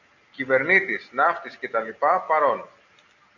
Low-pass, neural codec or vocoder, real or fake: 7.2 kHz; none; real